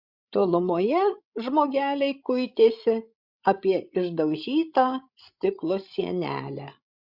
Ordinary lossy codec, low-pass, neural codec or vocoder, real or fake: Opus, 64 kbps; 5.4 kHz; codec, 16 kHz, 16 kbps, FreqCodec, larger model; fake